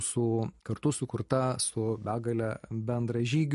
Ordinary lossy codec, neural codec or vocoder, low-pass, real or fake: MP3, 48 kbps; none; 14.4 kHz; real